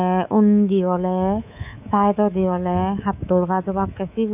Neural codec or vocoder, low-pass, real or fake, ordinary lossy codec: codec, 24 kHz, 3.1 kbps, DualCodec; 3.6 kHz; fake; none